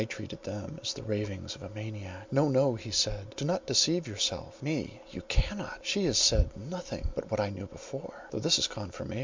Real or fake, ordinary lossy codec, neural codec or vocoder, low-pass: real; MP3, 64 kbps; none; 7.2 kHz